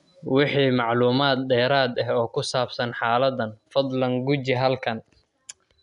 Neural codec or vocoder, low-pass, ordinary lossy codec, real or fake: none; 10.8 kHz; none; real